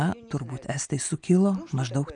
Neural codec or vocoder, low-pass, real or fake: none; 9.9 kHz; real